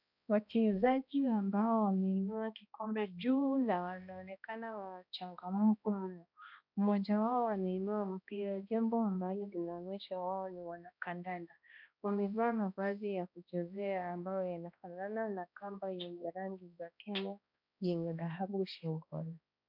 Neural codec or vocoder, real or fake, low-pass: codec, 16 kHz, 1 kbps, X-Codec, HuBERT features, trained on balanced general audio; fake; 5.4 kHz